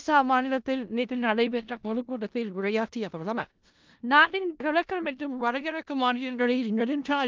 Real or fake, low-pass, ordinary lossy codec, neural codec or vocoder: fake; 7.2 kHz; Opus, 24 kbps; codec, 16 kHz in and 24 kHz out, 0.4 kbps, LongCat-Audio-Codec, four codebook decoder